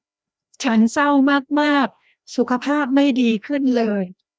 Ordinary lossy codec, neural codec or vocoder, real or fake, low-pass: none; codec, 16 kHz, 1 kbps, FreqCodec, larger model; fake; none